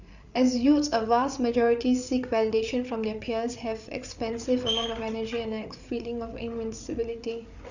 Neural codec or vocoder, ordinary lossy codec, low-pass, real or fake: codec, 16 kHz, 8 kbps, FreqCodec, larger model; none; 7.2 kHz; fake